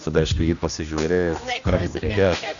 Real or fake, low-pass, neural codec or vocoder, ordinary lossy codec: fake; 7.2 kHz; codec, 16 kHz, 1 kbps, X-Codec, HuBERT features, trained on general audio; MP3, 96 kbps